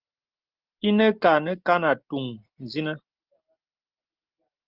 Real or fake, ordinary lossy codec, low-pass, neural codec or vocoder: real; Opus, 24 kbps; 5.4 kHz; none